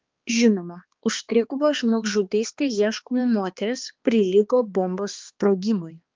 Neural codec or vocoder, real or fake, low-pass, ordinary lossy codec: codec, 16 kHz, 2 kbps, X-Codec, HuBERT features, trained on balanced general audio; fake; 7.2 kHz; Opus, 32 kbps